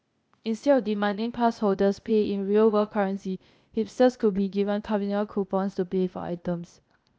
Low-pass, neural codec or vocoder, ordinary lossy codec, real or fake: none; codec, 16 kHz, 0.8 kbps, ZipCodec; none; fake